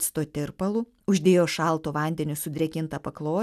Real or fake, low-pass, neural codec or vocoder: real; 14.4 kHz; none